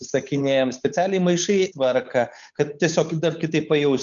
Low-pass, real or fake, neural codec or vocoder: 7.2 kHz; fake; codec, 16 kHz, 8 kbps, FunCodec, trained on Chinese and English, 25 frames a second